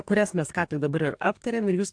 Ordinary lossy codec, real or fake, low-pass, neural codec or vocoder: AAC, 64 kbps; fake; 9.9 kHz; codec, 44.1 kHz, 2.6 kbps, DAC